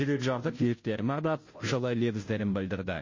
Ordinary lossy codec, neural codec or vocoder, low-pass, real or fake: MP3, 32 kbps; codec, 16 kHz, 0.5 kbps, FunCodec, trained on Chinese and English, 25 frames a second; 7.2 kHz; fake